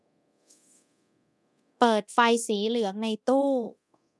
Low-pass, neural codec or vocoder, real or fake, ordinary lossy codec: none; codec, 24 kHz, 0.9 kbps, DualCodec; fake; none